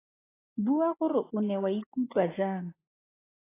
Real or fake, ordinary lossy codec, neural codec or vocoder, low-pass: real; AAC, 16 kbps; none; 3.6 kHz